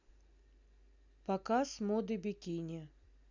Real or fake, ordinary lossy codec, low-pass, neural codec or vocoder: real; none; 7.2 kHz; none